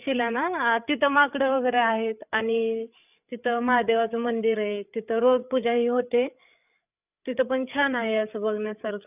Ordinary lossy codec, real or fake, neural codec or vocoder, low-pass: none; fake; codec, 16 kHz, 8 kbps, FreqCodec, larger model; 3.6 kHz